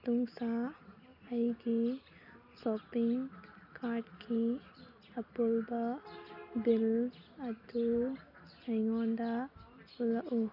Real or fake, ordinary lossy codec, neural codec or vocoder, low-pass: real; none; none; 5.4 kHz